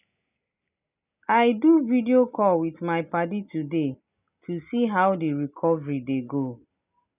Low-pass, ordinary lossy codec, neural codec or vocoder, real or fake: 3.6 kHz; none; none; real